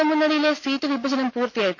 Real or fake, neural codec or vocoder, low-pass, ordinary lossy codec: real; none; none; none